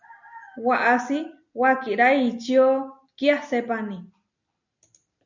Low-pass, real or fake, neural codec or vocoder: 7.2 kHz; real; none